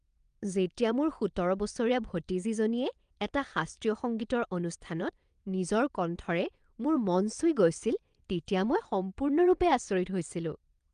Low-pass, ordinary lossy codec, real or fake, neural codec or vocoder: 9.9 kHz; Opus, 32 kbps; fake; vocoder, 22.05 kHz, 80 mel bands, WaveNeXt